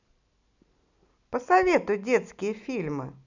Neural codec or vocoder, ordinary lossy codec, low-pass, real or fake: none; none; 7.2 kHz; real